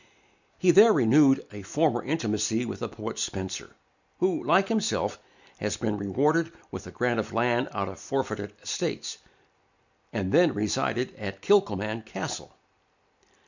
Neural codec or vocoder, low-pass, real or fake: none; 7.2 kHz; real